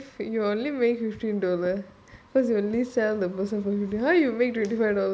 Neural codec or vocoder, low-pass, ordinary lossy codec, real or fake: none; none; none; real